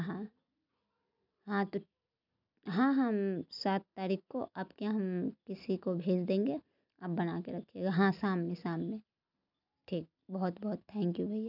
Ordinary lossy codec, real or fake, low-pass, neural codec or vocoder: none; real; 5.4 kHz; none